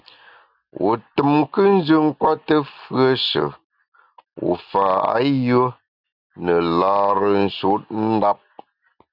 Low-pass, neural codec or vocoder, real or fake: 5.4 kHz; none; real